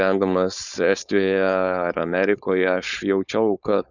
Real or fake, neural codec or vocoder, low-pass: fake; codec, 16 kHz, 4.8 kbps, FACodec; 7.2 kHz